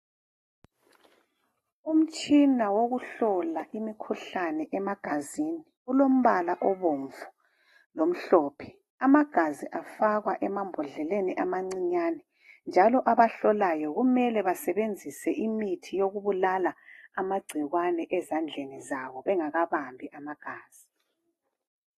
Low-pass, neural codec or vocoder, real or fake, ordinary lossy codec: 19.8 kHz; none; real; AAC, 32 kbps